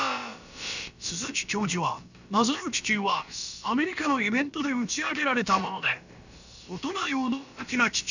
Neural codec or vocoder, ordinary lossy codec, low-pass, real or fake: codec, 16 kHz, about 1 kbps, DyCAST, with the encoder's durations; none; 7.2 kHz; fake